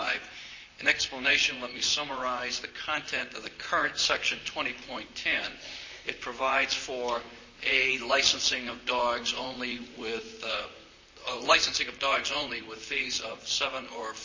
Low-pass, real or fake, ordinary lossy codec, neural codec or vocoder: 7.2 kHz; fake; MP3, 32 kbps; vocoder, 44.1 kHz, 128 mel bands, Pupu-Vocoder